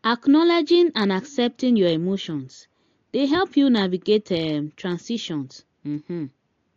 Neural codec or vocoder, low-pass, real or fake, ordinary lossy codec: none; 7.2 kHz; real; AAC, 48 kbps